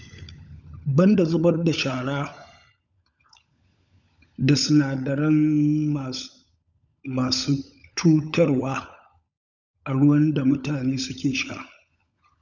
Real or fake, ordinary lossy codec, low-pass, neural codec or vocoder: fake; none; 7.2 kHz; codec, 16 kHz, 16 kbps, FunCodec, trained on LibriTTS, 50 frames a second